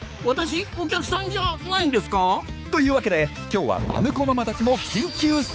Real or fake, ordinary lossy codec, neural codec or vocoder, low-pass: fake; none; codec, 16 kHz, 4 kbps, X-Codec, HuBERT features, trained on balanced general audio; none